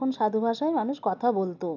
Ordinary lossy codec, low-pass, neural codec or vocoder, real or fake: none; 7.2 kHz; none; real